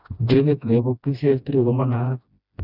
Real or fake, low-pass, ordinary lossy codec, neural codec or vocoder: fake; 5.4 kHz; none; codec, 16 kHz, 1 kbps, FreqCodec, smaller model